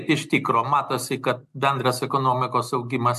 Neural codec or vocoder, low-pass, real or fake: none; 14.4 kHz; real